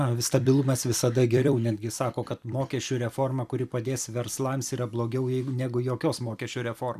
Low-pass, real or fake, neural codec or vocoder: 14.4 kHz; fake; vocoder, 44.1 kHz, 128 mel bands every 256 samples, BigVGAN v2